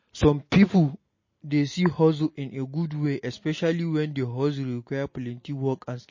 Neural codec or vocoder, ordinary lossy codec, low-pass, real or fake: none; MP3, 32 kbps; 7.2 kHz; real